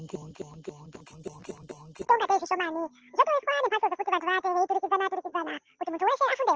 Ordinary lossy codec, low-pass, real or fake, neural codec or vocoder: Opus, 16 kbps; 7.2 kHz; real; none